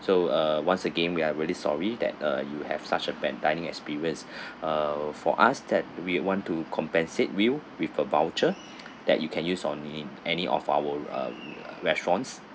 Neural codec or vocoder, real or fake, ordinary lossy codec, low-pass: none; real; none; none